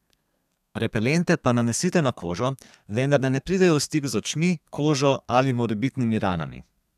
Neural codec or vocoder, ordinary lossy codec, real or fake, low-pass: codec, 32 kHz, 1.9 kbps, SNAC; none; fake; 14.4 kHz